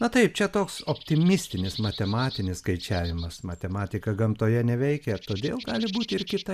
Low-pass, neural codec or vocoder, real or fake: 14.4 kHz; none; real